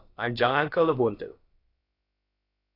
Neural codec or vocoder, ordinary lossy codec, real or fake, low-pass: codec, 16 kHz, about 1 kbps, DyCAST, with the encoder's durations; AAC, 24 kbps; fake; 5.4 kHz